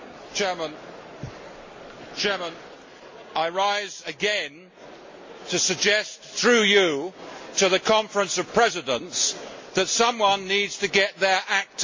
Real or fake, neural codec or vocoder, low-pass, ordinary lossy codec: real; none; 7.2 kHz; MP3, 32 kbps